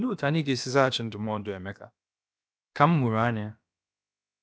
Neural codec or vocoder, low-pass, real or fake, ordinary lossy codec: codec, 16 kHz, about 1 kbps, DyCAST, with the encoder's durations; none; fake; none